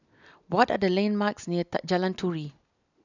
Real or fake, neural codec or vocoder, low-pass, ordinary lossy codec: real; none; 7.2 kHz; none